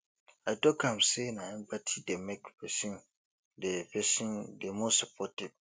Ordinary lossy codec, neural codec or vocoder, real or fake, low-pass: none; none; real; none